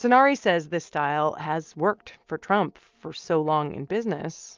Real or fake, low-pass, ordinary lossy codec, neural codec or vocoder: real; 7.2 kHz; Opus, 24 kbps; none